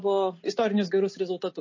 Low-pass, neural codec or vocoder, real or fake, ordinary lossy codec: 7.2 kHz; none; real; MP3, 32 kbps